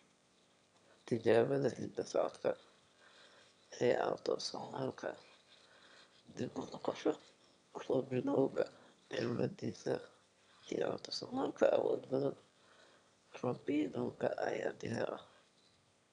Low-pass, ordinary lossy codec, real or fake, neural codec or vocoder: 9.9 kHz; none; fake; autoencoder, 22.05 kHz, a latent of 192 numbers a frame, VITS, trained on one speaker